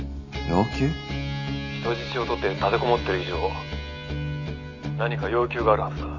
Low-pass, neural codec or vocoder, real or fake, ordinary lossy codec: 7.2 kHz; none; real; none